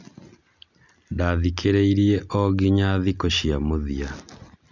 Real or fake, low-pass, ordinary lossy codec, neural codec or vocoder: real; 7.2 kHz; none; none